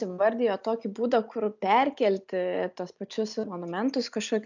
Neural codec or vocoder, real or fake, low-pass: none; real; 7.2 kHz